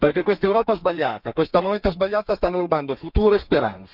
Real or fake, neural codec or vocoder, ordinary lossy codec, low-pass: fake; codec, 32 kHz, 1.9 kbps, SNAC; none; 5.4 kHz